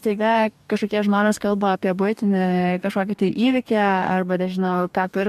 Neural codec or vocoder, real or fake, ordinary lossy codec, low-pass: codec, 44.1 kHz, 2.6 kbps, DAC; fake; AAC, 96 kbps; 14.4 kHz